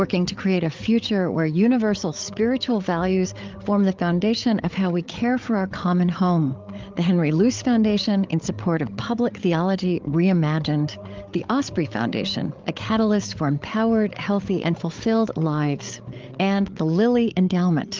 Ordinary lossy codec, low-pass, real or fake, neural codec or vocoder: Opus, 32 kbps; 7.2 kHz; fake; codec, 16 kHz, 8 kbps, FreqCodec, larger model